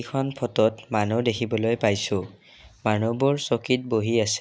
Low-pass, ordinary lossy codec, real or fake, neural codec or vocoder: none; none; real; none